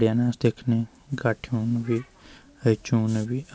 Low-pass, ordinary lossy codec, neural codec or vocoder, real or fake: none; none; none; real